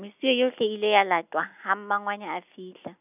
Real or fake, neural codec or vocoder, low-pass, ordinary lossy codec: real; none; 3.6 kHz; none